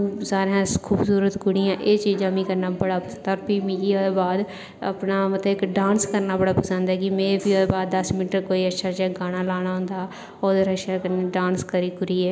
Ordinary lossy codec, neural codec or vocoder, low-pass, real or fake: none; none; none; real